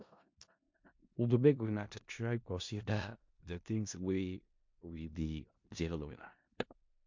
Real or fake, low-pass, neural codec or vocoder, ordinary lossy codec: fake; 7.2 kHz; codec, 16 kHz in and 24 kHz out, 0.4 kbps, LongCat-Audio-Codec, four codebook decoder; MP3, 48 kbps